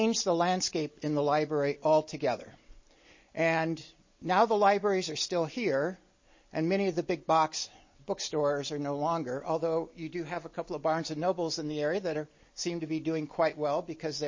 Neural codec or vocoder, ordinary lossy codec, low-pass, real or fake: none; MP3, 32 kbps; 7.2 kHz; real